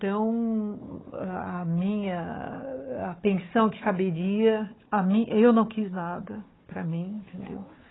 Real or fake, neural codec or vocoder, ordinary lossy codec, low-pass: fake; codec, 16 kHz, 4 kbps, FunCodec, trained on Chinese and English, 50 frames a second; AAC, 16 kbps; 7.2 kHz